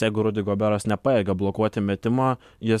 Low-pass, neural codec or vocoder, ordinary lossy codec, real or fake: 14.4 kHz; none; MP3, 96 kbps; real